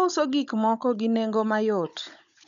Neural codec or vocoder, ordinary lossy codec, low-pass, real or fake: codec, 16 kHz, 16 kbps, FunCodec, trained on Chinese and English, 50 frames a second; none; 7.2 kHz; fake